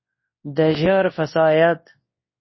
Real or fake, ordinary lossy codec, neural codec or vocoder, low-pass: fake; MP3, 24 kbps; codec, 24 kHz, 0.9 kbps, WavTokenizer, large speech release; 7.2 kHz